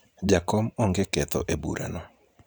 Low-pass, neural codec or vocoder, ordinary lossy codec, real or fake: none; vocoder, 44.1 kHz, 128 mel bands every 512 samples, BigVGAN v2; none; fake